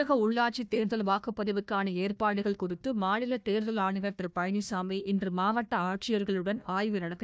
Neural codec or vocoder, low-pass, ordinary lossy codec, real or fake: codec, 16 kHz, 1 kbps, FunCodec, trained on Chinese and English, 50 frames a second; none; none; fake